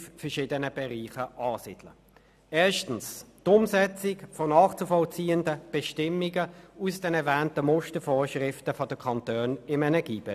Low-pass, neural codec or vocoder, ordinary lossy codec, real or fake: 14.4 kHz; none; none; real